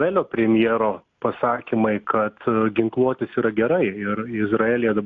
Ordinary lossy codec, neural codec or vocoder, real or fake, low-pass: AAC, 64 kbps; none; real; 7.2 kHz